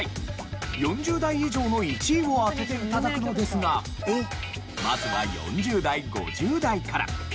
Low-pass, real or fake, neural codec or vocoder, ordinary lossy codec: none; real; none; none